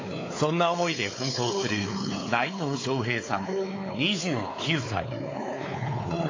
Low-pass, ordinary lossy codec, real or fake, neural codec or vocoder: 7.2 kHz; AAC, 32 kbps; fake; codec, 16 kHz, 4 kbps, X-Codec, WavLM features, trained on Multilingual LibriSpeech